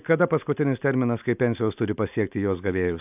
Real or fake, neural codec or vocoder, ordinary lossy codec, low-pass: real; none; AAC, 32 kbps; 3.6 kHz